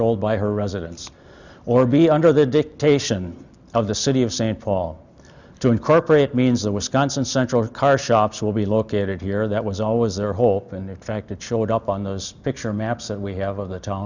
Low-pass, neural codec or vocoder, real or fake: 7.2 kHz; none; real